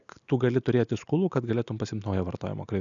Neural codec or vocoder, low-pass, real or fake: none; 7.2 kHz; real